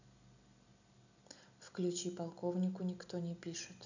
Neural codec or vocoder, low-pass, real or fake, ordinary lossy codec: none; 7.2 kHz; real; none